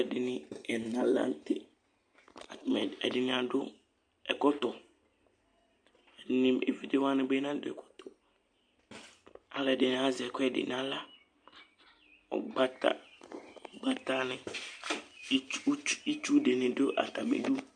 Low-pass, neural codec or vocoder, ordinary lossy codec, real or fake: 9.9 kHz; none; MP3, 64 kbps; real